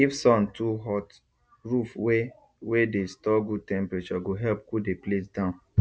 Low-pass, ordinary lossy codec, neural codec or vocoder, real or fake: none; none; none; real